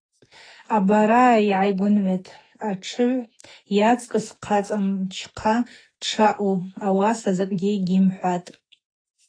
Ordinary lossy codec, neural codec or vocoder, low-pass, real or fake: AAC, 32 kbps; autoencoder, 48 kHz, 32 numbers a frame, DAC-VAE, trained on Japanese speech; 9.9 kHz; fake